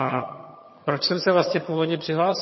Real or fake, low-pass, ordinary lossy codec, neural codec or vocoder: fake; 7.2 kHz; MP3, 24 kbps; vocoder, 22.05 kHz, 80 mel bands, HiFi-GAN